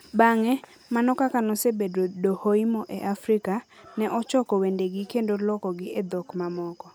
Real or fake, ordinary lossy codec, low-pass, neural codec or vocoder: real; none; none; none